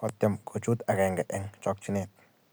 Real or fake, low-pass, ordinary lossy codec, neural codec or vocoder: real; none; none; none